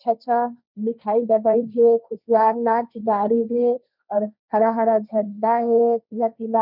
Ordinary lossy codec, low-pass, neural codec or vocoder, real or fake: none; 5.4 kHz; codec, 16 kHz, 1.1 kbps, Voila-Tokenizer; fake